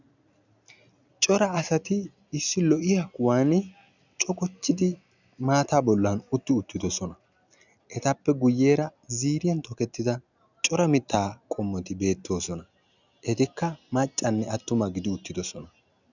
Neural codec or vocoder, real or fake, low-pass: none; real; 7.2 kHz